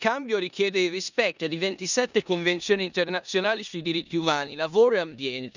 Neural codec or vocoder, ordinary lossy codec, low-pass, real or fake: codec, 16 kHz in and 24 kHz out, 0.9 kbps, LongCat-Audio-Codec, four codebook decoder; none; 7.2 kHz; fake